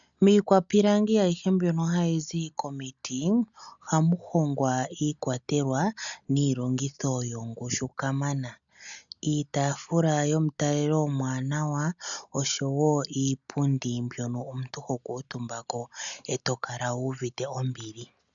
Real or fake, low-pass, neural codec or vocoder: real; 7.2 kHz; none